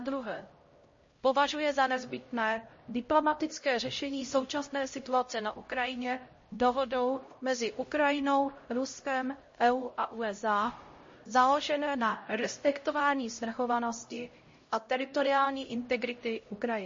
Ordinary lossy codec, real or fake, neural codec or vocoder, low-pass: MP3, 32 kbps; fake; codec, 16 kHz, 0.5 kbps, X-Codec, HuBERT features, trained on LibriSpeech; 7.2 kHz